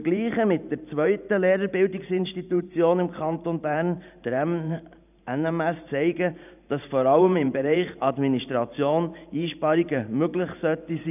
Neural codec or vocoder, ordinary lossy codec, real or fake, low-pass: vocoder, 44.1 kHz, 128 mel bands every 512 samples, BigVGAN v2; none; fake; 3.6 kHz